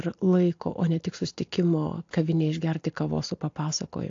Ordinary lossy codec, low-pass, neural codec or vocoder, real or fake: AAC, 48 kbps; 7.2 kHz; none; real